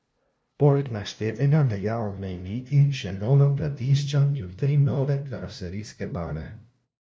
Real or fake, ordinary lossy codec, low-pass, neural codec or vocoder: fake; none; none; codec, 16 kHz, 0.5 kbps, FunCodec, trained on LibriTTS, 25 frames a second